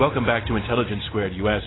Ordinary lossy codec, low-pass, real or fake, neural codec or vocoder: AAC, 16 kbps; 7.2 kHz; real; none